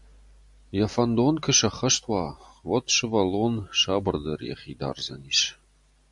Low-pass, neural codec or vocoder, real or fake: 10.8 kHz; none; real